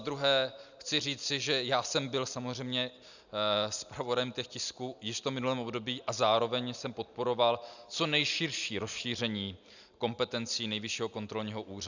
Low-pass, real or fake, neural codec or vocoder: 7.2 kHz; real; none